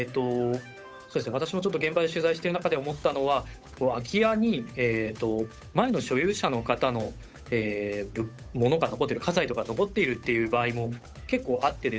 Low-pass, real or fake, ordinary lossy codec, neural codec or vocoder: none; fake; none; codec, 16 kHz, 8 kbps, FunCodec, trained on Chinese and English, 25 frames a second